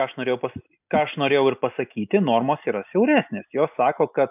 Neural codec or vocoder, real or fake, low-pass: none; real; 3.6 kHz